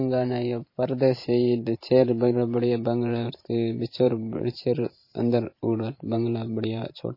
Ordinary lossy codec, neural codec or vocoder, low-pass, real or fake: MP3, 24 kbps; vocoder, 44.1 kHz, 128 mel bands every 512 samples, BigVGAN v2; 5.4 kHz; fake